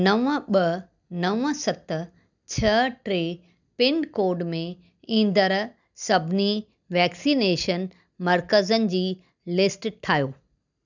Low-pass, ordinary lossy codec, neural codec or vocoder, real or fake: 7.2 kHz; none; none; real